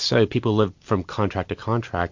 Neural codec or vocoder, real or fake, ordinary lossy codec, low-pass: none; real; MP3, 48 kbps; 7.2 kHz